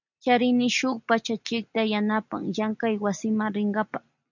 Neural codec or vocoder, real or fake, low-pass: none; real; 7.2 kHz